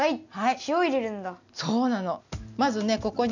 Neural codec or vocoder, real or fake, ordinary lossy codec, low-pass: none; real; none; 7.2 kHz